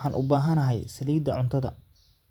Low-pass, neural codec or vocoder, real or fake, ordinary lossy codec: 19.8 kHz; vocoder, 44.1 kHz, 128 mel bands every 512 samples, BigVGAN v2; fake; MP3, 96 kbps